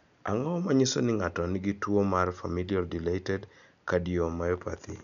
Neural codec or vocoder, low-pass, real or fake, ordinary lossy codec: none; 7.2 kHz; real; none